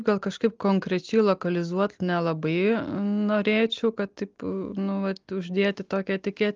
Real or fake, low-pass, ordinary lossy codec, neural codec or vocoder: real; 7.2 kHz; Opus, 32 kbps; none